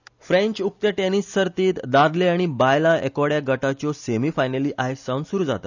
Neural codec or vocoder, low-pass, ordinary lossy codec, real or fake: none; 7.2 kHz; none; real